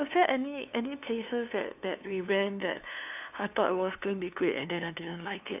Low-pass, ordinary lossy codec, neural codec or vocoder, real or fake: 3.6 kHz; none; codec, 16 kHz, 2 kbps, FunCodec, trained on LibriTTS, 25 frames a second; fake